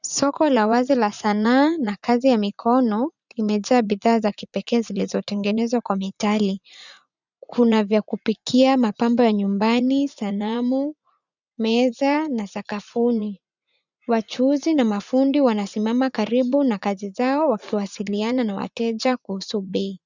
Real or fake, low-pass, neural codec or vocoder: fake; 7.2 kHz; vocoder, 44.1 kHz, 80 mel bands, Vocos